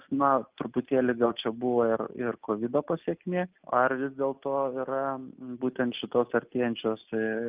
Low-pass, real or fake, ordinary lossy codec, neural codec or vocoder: 3.6 kHz; real; Opus, 24 kbps; none